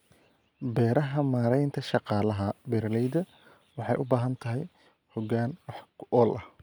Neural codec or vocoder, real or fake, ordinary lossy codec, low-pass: none; real; none; none